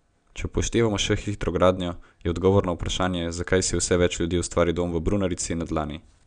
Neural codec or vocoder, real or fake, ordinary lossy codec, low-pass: none; real; none; 9.9 kHz